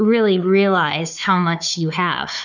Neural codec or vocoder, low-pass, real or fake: codec, 16 kHz, 4 kbps, FunCodec, trained on Chinese and English, 50 frames a second; 7.2 kHz; fake